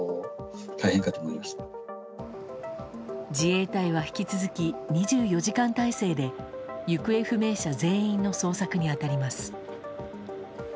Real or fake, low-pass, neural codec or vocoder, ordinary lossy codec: real; none; none; none